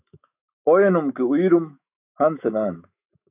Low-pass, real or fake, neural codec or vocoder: 3.6 kHz; fake; autoencoder, 48 kHz, 128 numbers a frame, DAC-VAE, trained on Japanese speech